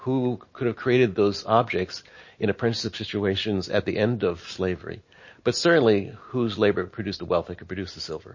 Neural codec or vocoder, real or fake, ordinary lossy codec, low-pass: none; real; MP3, 32 kbps; 7.2 kHz